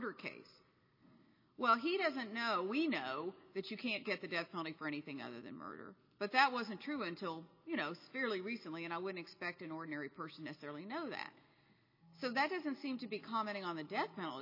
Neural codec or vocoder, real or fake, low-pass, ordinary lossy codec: none; real; 5.4 kHz; MP3, 24 kbps